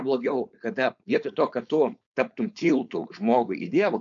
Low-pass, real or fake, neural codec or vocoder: 7.2 kHz; fake; codec, 16 kHz, 4.8 kbps, FACodec